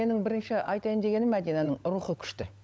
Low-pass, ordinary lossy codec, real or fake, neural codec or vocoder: none; none; fake; codec, 16 kHz, 16 kbps, FunCodec, trained on LibriTTS, 50 frames a second